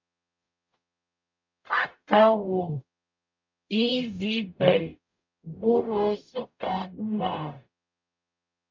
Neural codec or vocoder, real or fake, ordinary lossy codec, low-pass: codec, 44.1 kHz, 0.9 kbps, DAC; fake; MP3, 48 kbps; 7.2 kHz